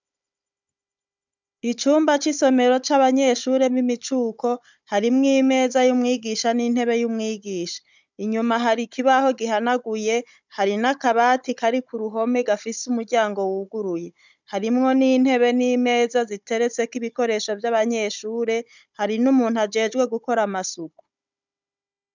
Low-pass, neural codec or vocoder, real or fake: 7.2 kHz; codec, 16 kHz, 16 kbps, FunCodec, trained on Chinese and English, 50 frames a second; fake